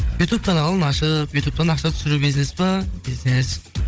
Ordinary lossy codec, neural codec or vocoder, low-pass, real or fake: none; codec, 16 kHz, 16 kbps, FunCodec, trained on Chinese and English, 50 frames a second; none; fake